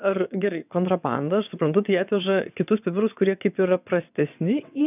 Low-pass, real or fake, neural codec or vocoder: 3.6 kHz; fake; vocoder, 22.05 kHz, 80 mel bands, WaveNeXt